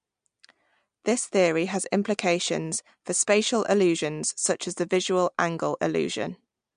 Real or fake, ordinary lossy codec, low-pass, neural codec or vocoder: real; MP3, 64 kbps; 9.9 kHz; none